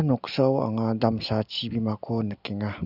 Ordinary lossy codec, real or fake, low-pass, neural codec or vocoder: none; real; 5.4 kHz; none